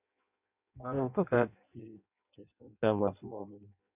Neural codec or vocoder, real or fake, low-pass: codec, 16 kHz in and 24 kHz out, 0.6 kbps, FireRedTTS-2 codec; fake; 3.6 kHz